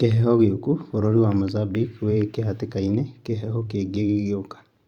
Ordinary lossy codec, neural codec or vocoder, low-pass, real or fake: Opus, 64 kbps; vocoder, 44.1 kHz, 128 mel bands every 512 samples, BigVGAN v2; 19.8 kHz; fake